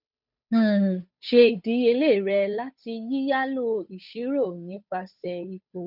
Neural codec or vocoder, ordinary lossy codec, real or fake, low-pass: codec, 16 kHz, 8 kbps, FunCodec, trained on Chinese and English, 25 frames a second; none; fake; 5.4 kHz